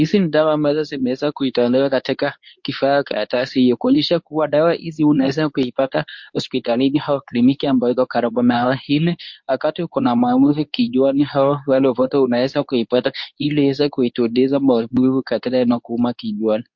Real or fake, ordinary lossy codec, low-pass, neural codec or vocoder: fake; MP3, 48 kbps; 7.2 kHz; codec, 24 kHz, 0.9 kbps, WavTokenizer, medium speech release version 2